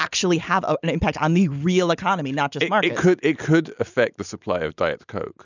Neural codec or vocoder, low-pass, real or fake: none; 7.2 kHz; real